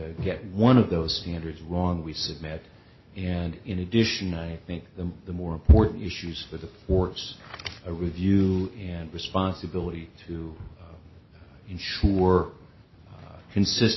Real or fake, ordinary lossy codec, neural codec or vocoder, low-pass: real; MP3, 24 kbps; none; 7.2 kHz